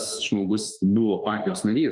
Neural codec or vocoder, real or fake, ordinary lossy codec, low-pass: autoencoder, 48 kHz, 32 numbers a frame, DAC-VAE, trained on Japanese speech; fake; Opus, 32 kbps; 10.8 kHz